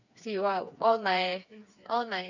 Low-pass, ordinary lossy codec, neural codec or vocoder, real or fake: 7.2 kHz; none; codec, 16 kHz, 4 kbps, FreqCodec, smaller model; fake